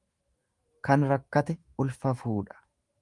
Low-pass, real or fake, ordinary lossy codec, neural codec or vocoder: 10.8 kHz; fake; Opus, 24 kbps; autoencoder, 48 kHz, 128 numbers a frame, DAC-VAE, trained on Japanese speech